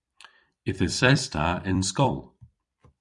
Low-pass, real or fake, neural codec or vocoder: 10.8 kHz; fake; vocoder, 44.1 kHz, 128 mel bands every 256 samples, BigVGAN v2